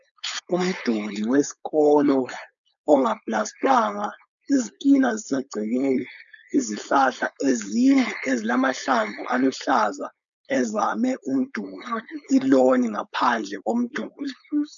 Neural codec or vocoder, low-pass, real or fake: codec, 16 kHz, 4.8 kbps, FACodec; 7.2 kHz; fake